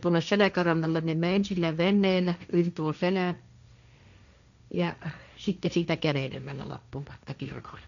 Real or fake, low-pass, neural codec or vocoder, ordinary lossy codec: fake; 7.2 kHz; codec, 16 kHz, 1.1 kbps, Voila-Tokenizer; none